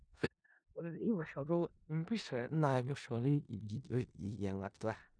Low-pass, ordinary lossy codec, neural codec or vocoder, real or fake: 9.9 kHz; none; codec, 16 kHz in and 24 kHz out, 0.4 kbps, LongCat-Audio-Codec, four codebook decoder; fake